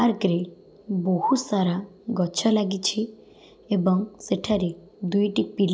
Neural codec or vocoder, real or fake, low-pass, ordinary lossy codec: none; real; none; none